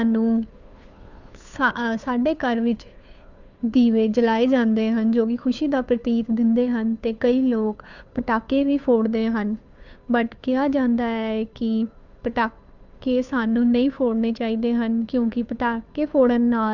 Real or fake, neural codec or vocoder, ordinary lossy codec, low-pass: fake; codec, 16 kHz, 2 kbps, FunCodec, trained on Chinese and English, 25 frames a second; none; 7.2 kHz